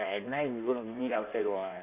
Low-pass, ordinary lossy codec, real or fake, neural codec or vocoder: 3.6 kHz; none; fake; codec, 16 kHz in and 24 kHz out, 1.1 kbps, FireRedTTS-2 codec